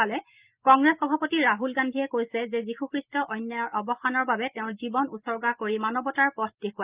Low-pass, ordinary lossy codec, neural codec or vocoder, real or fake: 3.6 kHz; Opus, 32 kbps; none; real